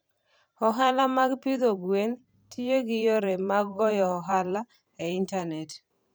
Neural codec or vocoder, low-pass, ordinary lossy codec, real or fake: vocoder, 44.1 kHz, 128 mel bands every 512 samples, BigVGAN v2; none; none; fake